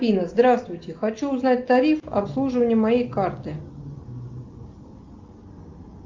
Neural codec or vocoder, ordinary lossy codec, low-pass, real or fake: none; Opus, 32 kbps; 7.2 kHz; real